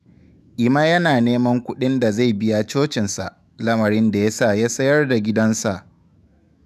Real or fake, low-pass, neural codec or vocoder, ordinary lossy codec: fake; 14.4 kHz; autoencoder, 48 kHz, 128 numbers a frame, DAC-VAE, trained on Japanese speech; none